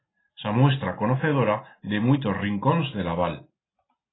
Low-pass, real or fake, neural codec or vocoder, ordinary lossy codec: 7.2 kHz; real; none; AAC, 16 kbps